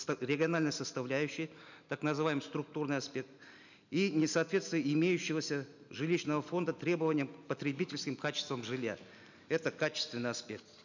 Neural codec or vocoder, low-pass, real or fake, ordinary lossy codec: none; 7.2 kHz; real; none